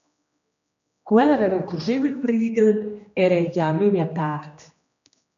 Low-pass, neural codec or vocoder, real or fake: 7.2 kHz; codec, 16 kHz, 1 kbps, X-Codec, HuBERT features, trained on general audio; fake